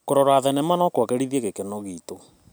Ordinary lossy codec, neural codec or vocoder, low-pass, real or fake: none; none; none; real